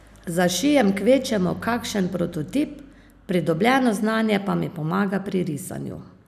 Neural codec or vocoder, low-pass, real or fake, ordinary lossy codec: none; 14.4 kHz; real; none